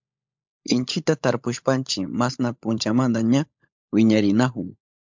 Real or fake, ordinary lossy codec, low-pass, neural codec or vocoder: fake; MP3, 64 kbps; 7.2 kHz; codec, 16 kHz, 16 kbps, FunCodec, trained on LibriTTS, 50 frames a second